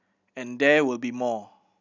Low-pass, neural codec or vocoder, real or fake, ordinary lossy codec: 7.2 kHz; none; real; none